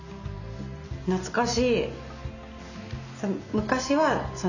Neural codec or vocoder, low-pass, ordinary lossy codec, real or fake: none; 7.2 kHz; none; real